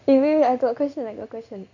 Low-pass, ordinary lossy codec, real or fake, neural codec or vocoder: 7.2 kHz; none; fake; codec, 16 kHz in and 24 kHz out, 1 kbps, XY-Tokenizer